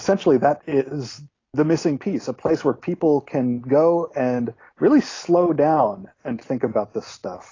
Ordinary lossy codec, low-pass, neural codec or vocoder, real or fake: AAC, 32 kbps; 7.2 kHz; none; real